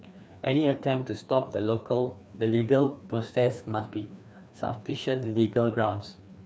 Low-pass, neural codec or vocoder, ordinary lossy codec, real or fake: none; codec, 16 kHz, 2 kbps, FreqCodec, larger model; none; fake